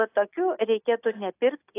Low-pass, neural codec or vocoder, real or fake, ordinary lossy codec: 3.6 kHz; none; real; AAC, 24 kbps